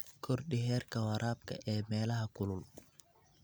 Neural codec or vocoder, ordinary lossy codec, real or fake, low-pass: none; none; real; none